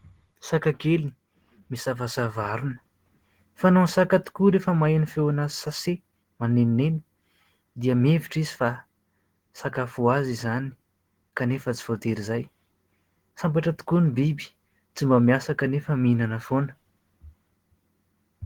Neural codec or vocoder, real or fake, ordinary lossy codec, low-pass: none; real; Opus, 16 kbps; 19.8 kHz